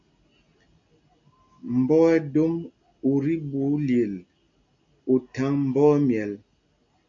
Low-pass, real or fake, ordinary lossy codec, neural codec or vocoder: 7.2 kHz; real; MP3, 64 kbps; none